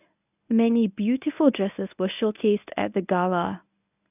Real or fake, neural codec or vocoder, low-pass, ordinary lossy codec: fake; codec, 24 kHz, 0.9 kbps, WavTokenizer, medium speech release version 1; 3.6 kHz; none